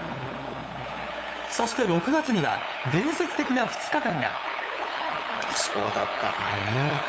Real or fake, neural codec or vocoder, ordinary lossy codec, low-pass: fake; codec, 16 kHz, 8 kbps, FunCodec, trained on LibriTTS, 25 frames a second; none; none